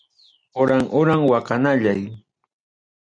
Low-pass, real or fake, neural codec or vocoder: 9.9 kHz; real; none